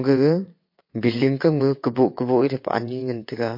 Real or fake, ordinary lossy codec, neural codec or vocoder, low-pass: fake; MP3, 32 kbps; vocoder, 22.05 kHz, 80 mel bands, WaveNeXt; 5.4 kHz